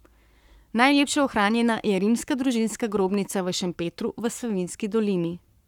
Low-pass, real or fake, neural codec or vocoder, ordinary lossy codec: 19.8 kHz; fake; codec, 44.1 kHz, 7.8 kbps, Pupu-Codec; none